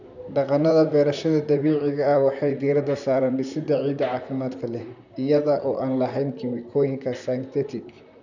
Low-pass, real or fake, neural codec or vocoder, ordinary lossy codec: 7.2 kHz; fake; vocoder, 44.1 kHz, 128 mel bands, Pupu-Vocoder; none